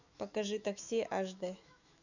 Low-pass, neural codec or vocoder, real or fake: 7.2 kHz; autoencoder, 48 kHz, 128 numbers a frame, DAC-VAE, trained on Japanese speech; fake